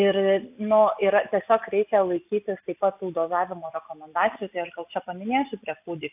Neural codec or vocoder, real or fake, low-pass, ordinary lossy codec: none; real; 3.6 kHz; AAC, 32 kbps